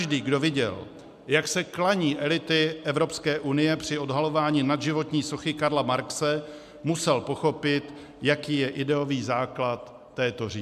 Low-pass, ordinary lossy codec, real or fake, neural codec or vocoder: 14.4 kHz; MP3, 96 kbps; real; none